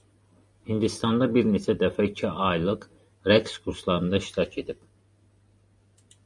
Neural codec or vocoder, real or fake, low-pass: none; real; 10.8 kHz